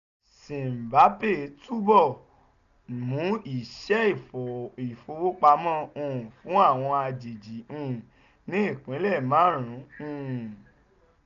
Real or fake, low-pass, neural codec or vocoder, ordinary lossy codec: real; 7.2 kHz; none; none